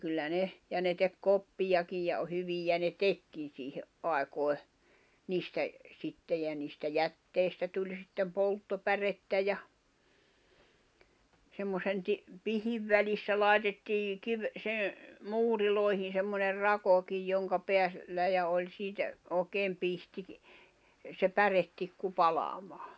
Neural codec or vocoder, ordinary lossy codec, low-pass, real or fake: none; none; none; real